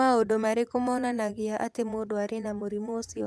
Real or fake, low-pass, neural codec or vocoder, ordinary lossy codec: fake; none; vocoder, 22.05 kHz, 80 mel bands, Vocos; none